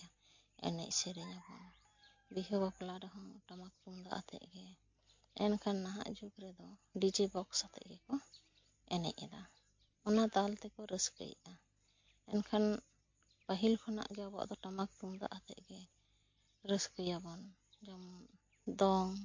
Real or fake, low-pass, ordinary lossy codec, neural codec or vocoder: real; 7.2 kHz; MP3, 48 kbps; none